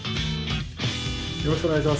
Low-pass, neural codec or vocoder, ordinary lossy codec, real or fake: none; none; none; real